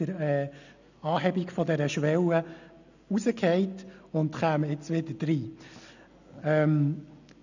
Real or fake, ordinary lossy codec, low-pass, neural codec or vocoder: real; none; 7.2 kHz; none